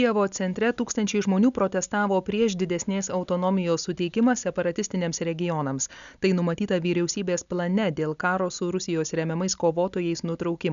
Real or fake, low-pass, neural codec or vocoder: real; 7.2 kHz; none